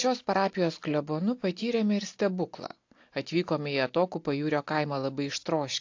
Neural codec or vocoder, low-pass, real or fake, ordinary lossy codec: none; 7.2 kHz; real; AAC, 48 kbps